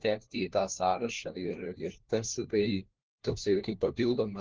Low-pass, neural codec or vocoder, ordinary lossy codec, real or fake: 7.2 kHz; codec, 16 kHz, 1 kbps, FunCodec, trained on LibriTTS, 50 frames a second; Opus, 16 kbps; fake